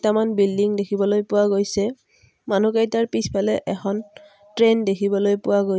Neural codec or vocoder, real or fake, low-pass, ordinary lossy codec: none; real; none; none